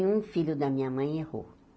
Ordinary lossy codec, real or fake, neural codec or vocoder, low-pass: none; real; none; none